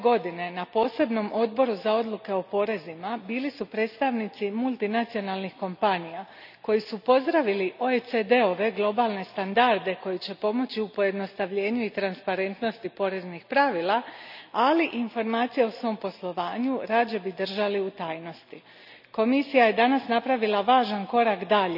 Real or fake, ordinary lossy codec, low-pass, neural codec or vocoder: real; none; 5.4 kHz; none